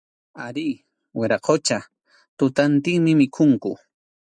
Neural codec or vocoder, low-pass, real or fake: none; 9.9 kHz; real